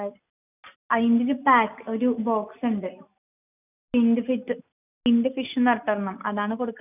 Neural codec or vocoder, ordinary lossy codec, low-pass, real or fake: none; none; 3.6 kHz; real